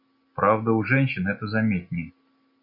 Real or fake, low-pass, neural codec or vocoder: real; 5.4 kHz; none